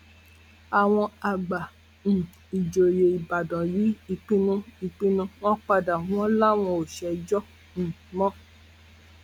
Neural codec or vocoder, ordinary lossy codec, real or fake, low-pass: none; none; real; 19.8 kHz